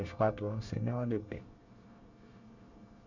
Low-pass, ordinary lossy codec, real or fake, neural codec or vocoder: 7.2 kHz; none; fake; codec, 24 kHz, 1 kbps, SNAC